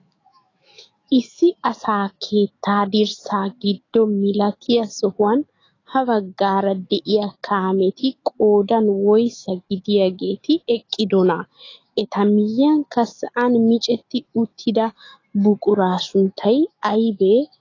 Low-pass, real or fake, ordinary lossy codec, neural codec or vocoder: 7.2 kHz; fake; AAC, 32 kbps; autoencoder, 48 kHz, 128 numbers a frame, DAC-VAE, trained on Japanese speech